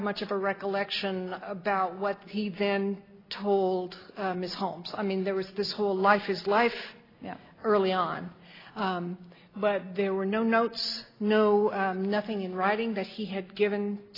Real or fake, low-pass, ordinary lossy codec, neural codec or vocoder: real; 5.4 kHz; AAC, 24 kbps; none